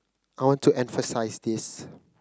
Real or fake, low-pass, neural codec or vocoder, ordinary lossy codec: real; none; none; none